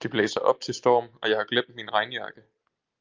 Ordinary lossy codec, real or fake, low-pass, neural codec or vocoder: Opus, 24 kbps; real; 7.2 kHz; none